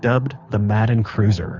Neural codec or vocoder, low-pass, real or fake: codec, 24 kHz, 6 kbps, HILCodec; 7.2 kHz; fake